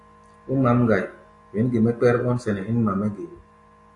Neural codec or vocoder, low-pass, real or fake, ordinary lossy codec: none; 10.8 kHz; real; AAC, 64 kbps